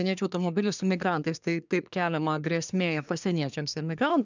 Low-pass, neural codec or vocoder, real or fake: 7.2 kHz; codec, 24 kHz, 1 kbps, SNAC; fake